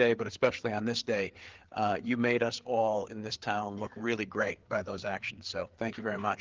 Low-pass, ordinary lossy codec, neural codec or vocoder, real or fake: 7.2 kHz; Opus, 16 kbps; codec, 16 kHz, 4 kbps, FreqCodec, larger model; fake